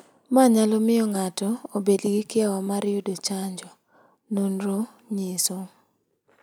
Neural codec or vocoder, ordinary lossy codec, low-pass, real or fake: none; none; none; real